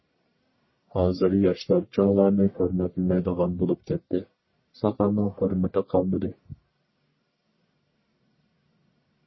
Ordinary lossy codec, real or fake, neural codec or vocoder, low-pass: MP3, 24 kbps; fake; codec, 44.1 kHz, 1.7 kbps, Pupu-Codec; 7.2 kHz